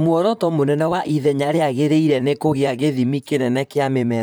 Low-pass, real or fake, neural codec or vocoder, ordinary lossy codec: none; fake; vocoder, 44.1 kHz, 128 mel bands, Pupu-Vocoder; none